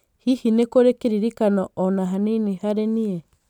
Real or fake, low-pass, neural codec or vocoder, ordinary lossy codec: real; 19.8 kHz; none; none